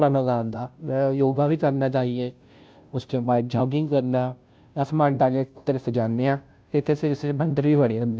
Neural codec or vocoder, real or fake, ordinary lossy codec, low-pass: codec, 16 kHz, 0.5 kbps, FunCodec, trained on Chinese and English, 25 frames a second; fake; none; none